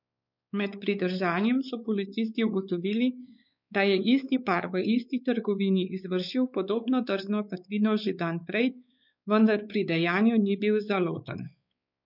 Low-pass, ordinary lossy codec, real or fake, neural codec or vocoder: 5.4 kHz; none; fake; codec, 16 kHz, 4 kbps, X-Codec, WavLM features, trained on Multilingual LibriSpeech